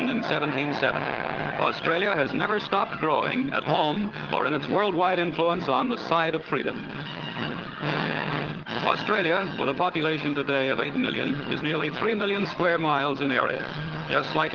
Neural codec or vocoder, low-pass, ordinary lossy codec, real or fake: vocoder, 22.05 kHz, 80 mel bands, HiFi-GAN; 7.2 kHz; Opus, 16 kbps; fake